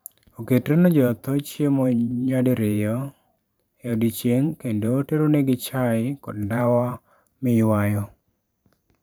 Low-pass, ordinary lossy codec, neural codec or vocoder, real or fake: none; none; vocoder, 44.1 kHz, 128 mel bands every 512 samples, BigVGAN v2; fake